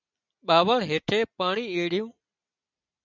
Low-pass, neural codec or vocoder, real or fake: 7.2 kHz; none; real